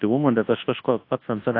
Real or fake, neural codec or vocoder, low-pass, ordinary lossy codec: fake; codec, 24 kHz, 0.9 kbps, WavTokenizer, large speech release; 5.4 kHz; AAC, 32 kbps